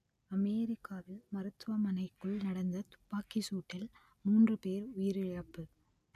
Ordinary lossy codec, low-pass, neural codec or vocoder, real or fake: none; 14.4 kHz; none; real